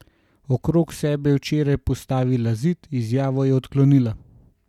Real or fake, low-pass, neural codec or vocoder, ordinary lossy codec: real; 19.8 kHz; none; none